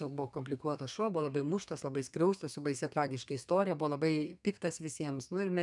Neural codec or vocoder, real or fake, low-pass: codec, 32 kHz, 1.9 kbps, SNAC; fake; 10.8 kHz